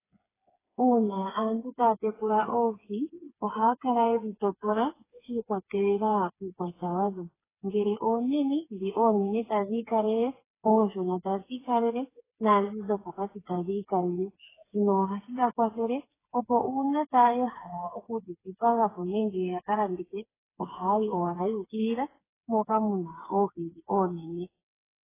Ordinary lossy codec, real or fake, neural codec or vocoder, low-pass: AAC, 16 kbps; fake; codec, 16 kHz, 4 kbps, FreqCodec, smaller model; 3.6 kHz